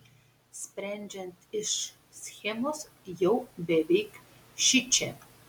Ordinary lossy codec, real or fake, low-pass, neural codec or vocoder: MP3, 96 kbps; real; 19.8 kHz; none